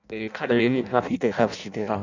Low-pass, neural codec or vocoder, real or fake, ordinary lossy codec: 7.2 kHz; codec, 16 kHz in and 24 kHz out, 0.6 kbps, FireRedTTS-2 codec; fake; none